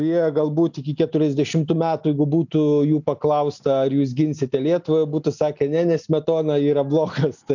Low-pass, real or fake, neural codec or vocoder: 7.2 kHz; real; none